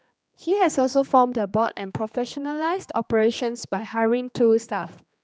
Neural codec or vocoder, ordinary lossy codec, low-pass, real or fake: codec, 16 kHz, 2 kbps, X-Codec, HuBERT features, trained on general audio; none; none; fake